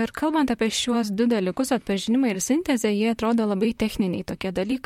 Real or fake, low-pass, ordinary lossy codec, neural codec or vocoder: fake; 19.8 kHz; MP3, 64 kbps; vocoder, 44.1 kHz, 128 mel bands, Pupu-Vocoder